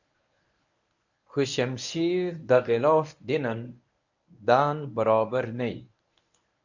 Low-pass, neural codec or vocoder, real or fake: 7.2 kHz; codec, 24 kHz, 0.9 kbps, WavTokenizer, medium speech release version 1; fake